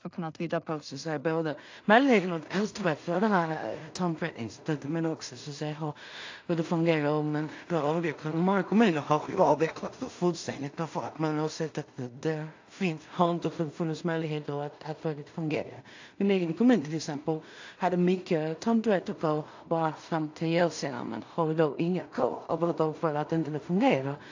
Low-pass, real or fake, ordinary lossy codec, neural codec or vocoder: 7.2 kHz; fake; AAC, 48 kbps; codec, 16 kHz in and 24 kHz out, 0.4 kbps, LongCat-Audio-Codec, two codebook decoder